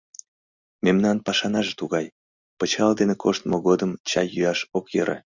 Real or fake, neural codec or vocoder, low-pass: real; none; 7.2 kHz